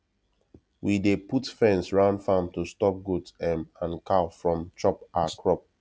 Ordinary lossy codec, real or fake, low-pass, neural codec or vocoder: none; real; none; none